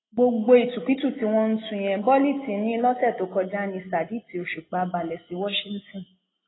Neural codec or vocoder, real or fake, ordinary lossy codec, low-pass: none; real; AAC, 16 kbps; 7.2 kHz